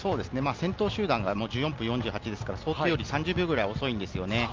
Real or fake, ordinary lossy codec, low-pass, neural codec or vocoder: real; Opus, 24 kbps; 7.2 kHz; none